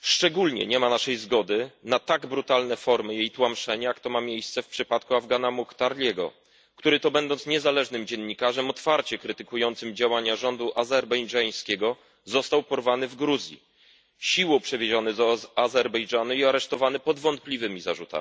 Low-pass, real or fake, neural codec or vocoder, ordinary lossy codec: none; real; none; none